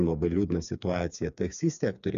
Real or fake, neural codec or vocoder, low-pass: fake; codec, 16 kHz, 4 kbps, FreqCodec, smaller model; 7.2 kHz